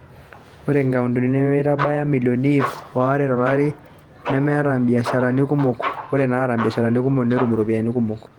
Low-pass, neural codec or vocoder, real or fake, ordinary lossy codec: 19.8 kHz; vocoder, 48 kHz, 128 mel bands, Vocos; fake; Opus, 24 kbps